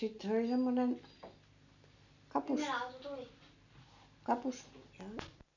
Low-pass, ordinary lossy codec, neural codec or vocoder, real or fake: 7.2 kHz; none; none; real